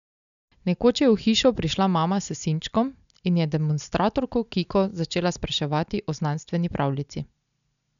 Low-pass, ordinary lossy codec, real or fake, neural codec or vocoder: 7.2 kHz; none; real; none